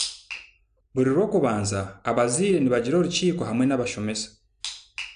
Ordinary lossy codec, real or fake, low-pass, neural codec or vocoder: AAC, 96 kbps; real; 9.9 kHz; none